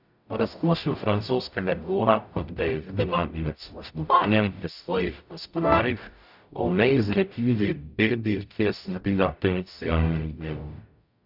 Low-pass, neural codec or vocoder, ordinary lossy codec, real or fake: 5.4 kHz; codec, 44.1 kHz, 0.9 kbps, DAC; none; fake